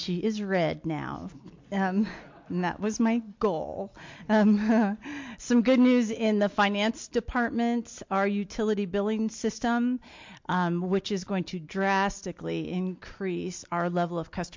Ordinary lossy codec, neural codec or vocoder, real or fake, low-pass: MP3, 48 kbps; none; real; 7.2 kHz